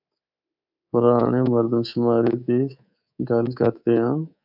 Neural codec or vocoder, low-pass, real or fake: codec, 24 kHz, 3.1 kbps, DualCodec; 5.4 kHz; fake